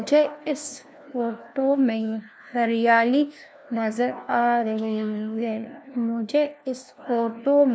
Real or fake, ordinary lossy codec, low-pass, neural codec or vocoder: fake; none; none; codec, 16 kHz, 1 kbps, FunCodec, trained on LibriTTS, 50 frames a second